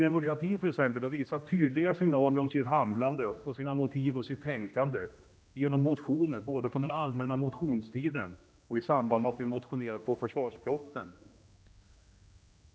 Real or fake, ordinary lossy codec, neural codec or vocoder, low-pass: fake; none; codec, 16 kHz, 1 kbps, X-Codec, HuBERT features, trained on general audio; none